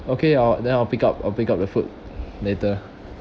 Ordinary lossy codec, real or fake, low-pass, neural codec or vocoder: none; real; none; none